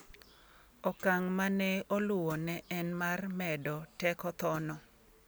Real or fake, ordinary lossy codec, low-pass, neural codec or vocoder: real; none; none; none